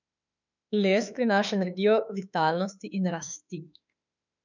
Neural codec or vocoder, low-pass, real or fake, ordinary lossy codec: autoencoder, 48 kHz, 32 numbers a frame, DAC-VAE, trained on Japanese speech; 7.2 kHz; fake; none